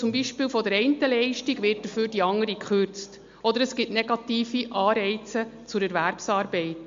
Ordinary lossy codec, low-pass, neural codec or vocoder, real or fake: MP3, 48 kbps; 7.2 kHz; none; real